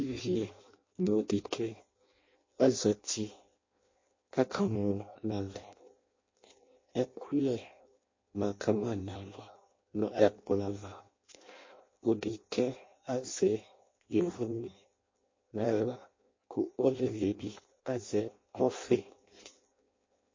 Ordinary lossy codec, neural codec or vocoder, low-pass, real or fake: MP3, 32 kbps; codec, 16 kHz in and 24 kHz out, 0.6 kbps, FireRedTTS-2 codec; 7.2 kHz; fake